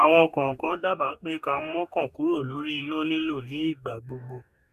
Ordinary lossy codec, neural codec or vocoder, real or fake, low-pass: none; codec, 44.1 kHz, 2.6 kbps, DAC; fake; 14.4 kHz